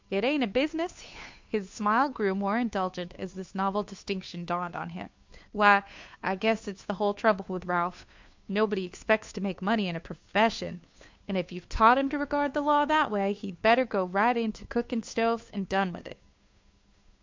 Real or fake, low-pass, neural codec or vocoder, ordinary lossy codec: fake; 7.2 kHz; codec, 16 kHz, 2 kbps, FunCodec, trained on Chinese and English, 25 frames a second; MP3, 64 kbps